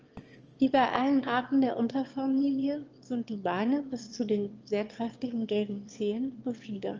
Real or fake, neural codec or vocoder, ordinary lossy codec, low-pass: fake; autoencoder, 22.05 kHz, a latent of 192 numbers a frame, VITS, trained on one speaker; Opus, 24 kbps; 7.2 kHz